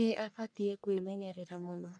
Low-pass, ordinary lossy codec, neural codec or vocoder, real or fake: 9.9 kHz; none; codec, 44.1 kHz, 1.7 kbps, Pupu-Codec; fake